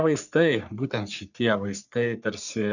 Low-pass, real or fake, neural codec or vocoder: 7.2 kHz; fake; codec, 44.1 kHz, 3.4 kbps, Pupu-Codec